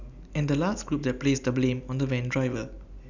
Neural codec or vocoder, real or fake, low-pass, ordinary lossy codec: none; real; 7.2 kHz; none